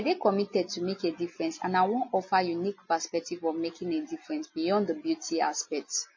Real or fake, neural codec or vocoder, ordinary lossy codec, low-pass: real; none; MP3, 32 kbps; 7.2 kHz